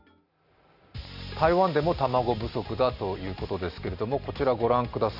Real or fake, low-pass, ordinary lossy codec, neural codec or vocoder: real; 5.4 kHz; Opus, 64 kbps; none